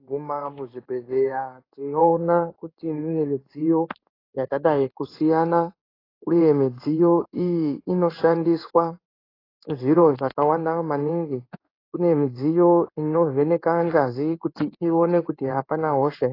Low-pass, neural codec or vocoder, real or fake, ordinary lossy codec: 5.4 kHz; codec, 16 kHz in and 24 kHz out, 1 kbps, XY-Tokenizer; fake; AAC, 24 kbps